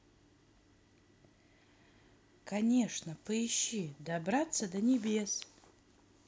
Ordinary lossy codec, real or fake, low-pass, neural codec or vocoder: none; real; none; none